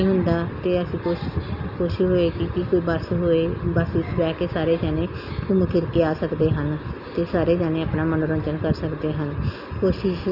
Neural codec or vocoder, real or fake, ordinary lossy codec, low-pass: none; real; none; 5.4 kHz